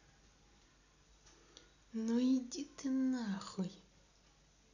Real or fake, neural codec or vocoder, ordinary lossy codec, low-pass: real; none; none; 7.2 kHz